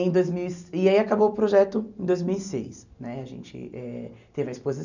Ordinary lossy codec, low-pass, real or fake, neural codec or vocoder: none; 7.2 kHz; real; none